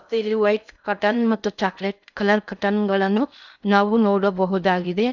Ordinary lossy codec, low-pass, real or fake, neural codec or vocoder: none; 7.2 kHz; fake; codec, 16 kHz in and 24 kHz out, 0.6 kbps, FocalCodec, streaming, 2048 codes